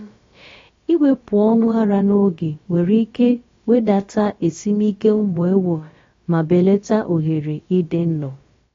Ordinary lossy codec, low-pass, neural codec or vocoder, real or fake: AAC, 32 kbps; 7.2 kHz; codec, 16 kHz, about 1 kbps, DyCAST, with the encoder's durations; fake